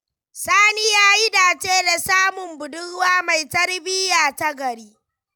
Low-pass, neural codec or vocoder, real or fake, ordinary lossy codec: none; none; real; none